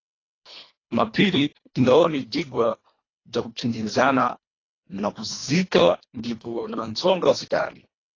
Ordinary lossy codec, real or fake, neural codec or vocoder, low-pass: AAC, 32 kbps; fake; codec, 24 kHz, 1.5 kbps, HILCodec; 7.2 kHz